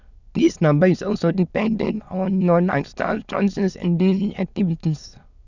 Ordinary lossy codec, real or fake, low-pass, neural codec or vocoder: none; fake; 7.2 kHz; autoencoder, 22.05 kHz, a latent of 192 numbers a frame, VITS, trained on many speakers